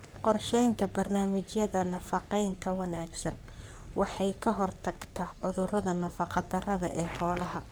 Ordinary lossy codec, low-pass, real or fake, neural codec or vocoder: none; none; fake; codec, 44.1 kHz, 3.4 kbps, Pupu-Codec